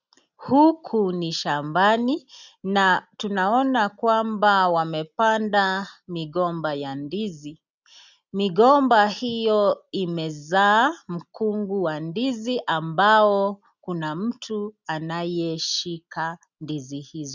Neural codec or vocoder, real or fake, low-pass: none; real; 7.2 kHz